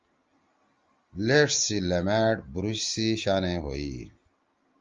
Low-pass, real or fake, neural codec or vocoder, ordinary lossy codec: 7.2 kHz; real; none; Opus, 32 kbps